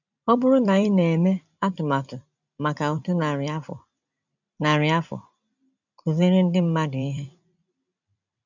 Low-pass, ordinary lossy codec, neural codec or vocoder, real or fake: 7.2 kHz; none; vocoder, 44.1 kHz, 128 mel bands every 256 samples, BigVGAN v2; fake